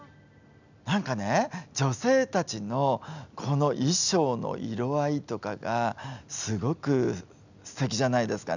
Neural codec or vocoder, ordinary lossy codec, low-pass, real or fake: none; none; 7.2 kHz; real